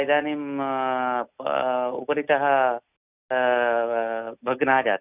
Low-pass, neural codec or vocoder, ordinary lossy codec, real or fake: 3.6 kHz; none; none; real